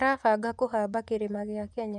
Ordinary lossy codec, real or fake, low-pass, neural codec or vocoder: none; fake; none; vocoder, 24 kHz, 100 mel bands, Vocos